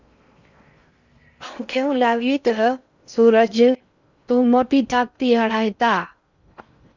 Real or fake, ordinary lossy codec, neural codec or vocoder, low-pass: fake; Opus, 64 kbps; codec, 16 kHz in and 24 kHz out, 0.6 kbps, FocalCodec, streaming, 4096 codes; 7.2 kHz